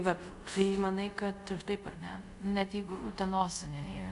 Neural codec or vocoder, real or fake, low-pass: codec, 24 kHz, 0.5 kbps, DualCodec; fake; 10.8 kHz